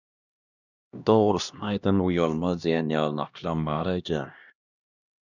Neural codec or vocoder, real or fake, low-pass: codec, 16 kHz, 1 kbps, X-Codec, HuBERT features, trained on LibriSpeech; fake; 7.2 kHz